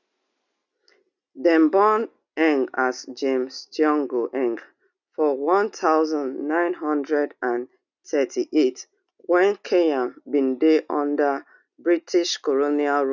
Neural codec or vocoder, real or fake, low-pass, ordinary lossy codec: none; real; 7.2 kHz; none